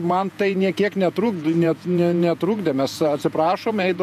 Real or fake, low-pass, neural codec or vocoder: fake; 14.4 kHz; vocoder, 48 kHz, 128 mel bands, Vocos